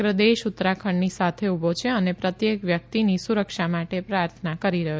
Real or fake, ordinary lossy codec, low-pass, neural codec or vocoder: real; none; none; none